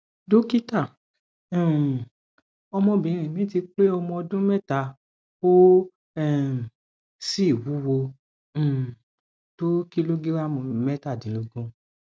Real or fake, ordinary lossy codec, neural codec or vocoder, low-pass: real; none; none; none